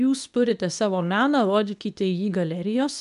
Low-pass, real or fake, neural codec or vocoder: 10.8 kHz; fake; codec, 24 kHz, 0.9 kbps, WavTokenizer, medium speech release version 2